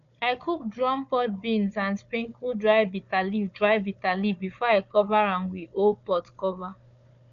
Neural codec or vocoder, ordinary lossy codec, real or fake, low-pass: codec, 16 kHz, 4 kbps, FunCodec, trained on Chinese and English, 50 frames a second; none; fake; 7.2 kHz